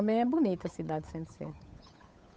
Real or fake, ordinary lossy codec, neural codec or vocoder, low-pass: fake; none; codec, 16 kHz, 8 kbps, FunCodec, trained on Chinese and English, 25 frames a second; none